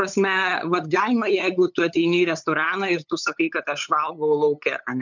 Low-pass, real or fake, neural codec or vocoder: 7.2 kHz; fake; codec, 16 kHz, 8 kbps, FunCodec, trained on LibriTTS, 25 frames a second